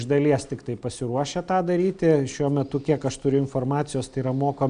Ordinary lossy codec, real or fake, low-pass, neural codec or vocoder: AAC, 96 kbps; real; 9.9 kHz; none